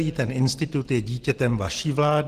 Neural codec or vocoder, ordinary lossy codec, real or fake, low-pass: none; Opus, 16 kbps; real; 14.4 kHz